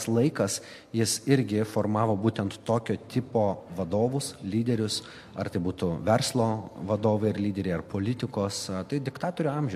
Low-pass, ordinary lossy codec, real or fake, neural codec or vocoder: 14.4 kHz; MP3, 64 kbps; real; none